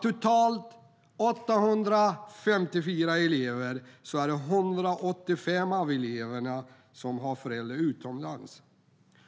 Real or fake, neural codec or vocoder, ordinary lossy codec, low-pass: real; none; none; none